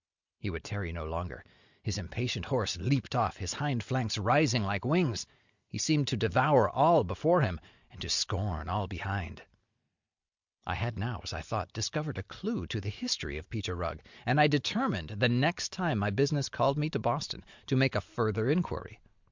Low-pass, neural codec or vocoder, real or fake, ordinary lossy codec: 7.2 kHz; none; real; Opus, 64 kbps